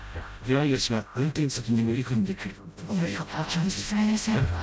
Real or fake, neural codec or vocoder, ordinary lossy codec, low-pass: fake; codec, 16 kHz, 0.5 kbps, FreqCodec, smaller model; none; none